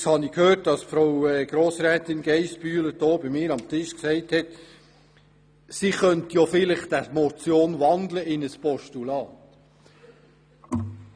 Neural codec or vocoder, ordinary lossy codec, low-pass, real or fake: none; none; 9.9 kHz; real